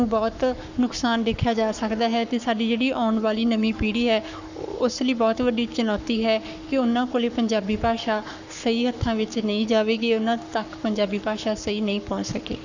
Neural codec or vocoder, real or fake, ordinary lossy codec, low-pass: codec, 44.1 kHz, 7.8 kbps, Pupu-Codec; fake; none; 7.2 kHz